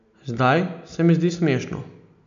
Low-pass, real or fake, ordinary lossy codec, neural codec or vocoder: 7.2 kHz; real; none; none